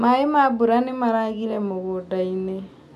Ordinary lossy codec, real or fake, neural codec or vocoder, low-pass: none; real; none; 14.4 kHz